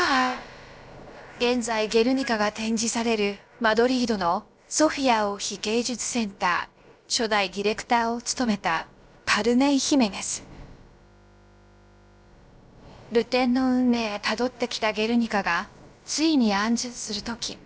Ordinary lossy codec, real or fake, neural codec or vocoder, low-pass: none; fake; codec, 16 kHz, about 1 kbps, DyCAST, with the encoder's durations; none